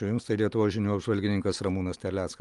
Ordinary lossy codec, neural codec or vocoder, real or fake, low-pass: Opus, 32 kbps; none; real; 10.8 kHz